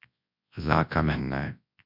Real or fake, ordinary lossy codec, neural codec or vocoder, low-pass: fake; MP3, 48 kbps; codec, 24 kHz, 0.9 kbps, WavTokenizer, large speech release; 5.4 kHz